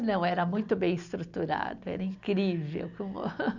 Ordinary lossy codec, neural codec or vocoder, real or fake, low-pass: none; none; real; 7.2 kHz